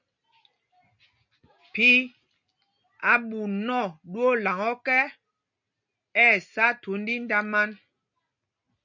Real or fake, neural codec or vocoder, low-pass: real; none; 7.2 kHz